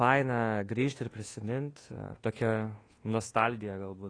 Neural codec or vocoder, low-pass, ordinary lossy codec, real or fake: codec, 24 kHz, 1.2 kbps, DualCodec; 9.9 kHz; AAC, 32 kbps; fake